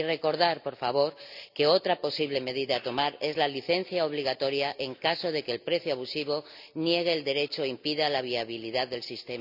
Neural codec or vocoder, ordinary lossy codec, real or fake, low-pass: none; none; real; 5.4 kHz